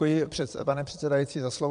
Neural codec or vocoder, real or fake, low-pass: vocoder, 22.05 kHz, 80 mel bands, Vocos; fake; 9.9 kHz